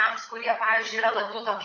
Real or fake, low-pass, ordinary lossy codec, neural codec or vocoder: fake; 7.2 kHz; AAC, 32 kbps; codec, 16 kHz, 16 kbps, FunCodec, trained on LibriTTS, 50 frames a second